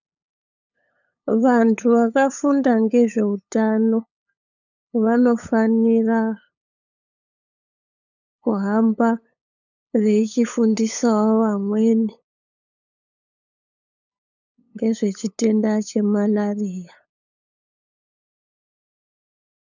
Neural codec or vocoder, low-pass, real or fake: codec, 16 kHz, 8 kbps, FunCodec, trained on LibriTTS, 25 frames a second; 7.2 kHz; fake